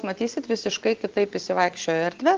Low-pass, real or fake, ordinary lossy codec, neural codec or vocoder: 7.2 kHz; real; Opus, 16 kbps; none